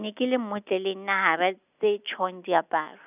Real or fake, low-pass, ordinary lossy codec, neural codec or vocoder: real; 3.6 kHz; none; none